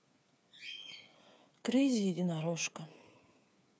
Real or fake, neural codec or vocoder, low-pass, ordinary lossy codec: fake; codec, 16 kHz, 8 kbps, FreqCodec, smaller model; none; none